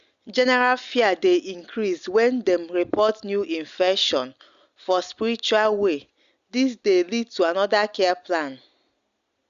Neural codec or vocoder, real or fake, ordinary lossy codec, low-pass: none; real; Opus, 64 kbps; 7.2 kHz